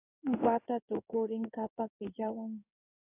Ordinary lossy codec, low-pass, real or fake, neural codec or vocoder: AAC, 24 kbps; 3.6 kHz; fake; codec, 16 kHz in and 24 kHz out, 1 kbps, XY-Tokenizer